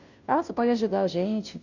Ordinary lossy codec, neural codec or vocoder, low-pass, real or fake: none; codec, 16 kHz, 0.5 kbps, FunCodec, trained on Chinese and English, 25 frames a second; 7.2 kHz; fake